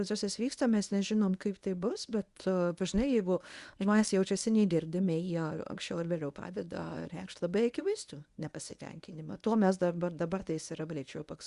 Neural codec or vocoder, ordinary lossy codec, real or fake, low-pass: codec, 24 kHz, 0.9 kbps, WavTokenizer, medium speech release version 1; Opus, 64 kbps; fake; 10.8 kHz